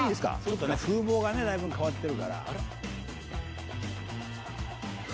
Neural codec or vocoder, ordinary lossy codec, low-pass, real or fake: none; none; none; real